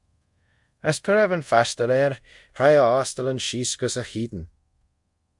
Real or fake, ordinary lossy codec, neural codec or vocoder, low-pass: fake; MP3, 64 kbps; codec, 24 kHz, 0.5 kbps, DualCodec; 10.8 kHz